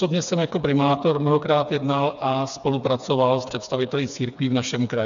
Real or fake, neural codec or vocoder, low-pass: fake; codec, 16 kHz, 4 kbps, FreqCodec, smaller model; 7.2 kHz